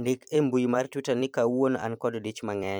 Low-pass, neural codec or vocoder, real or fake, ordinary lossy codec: none; vocoder, 44.1 kHz, 128 mel bands every 256 samples, BigVGAN v2; fake; none